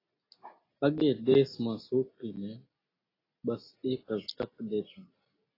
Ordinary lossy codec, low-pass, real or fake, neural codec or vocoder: AAC, 24 kbps; 5.4 kHz; real; none